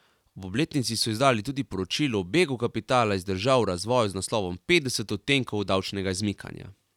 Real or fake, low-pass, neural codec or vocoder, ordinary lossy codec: real; 19.8 kHz; none; none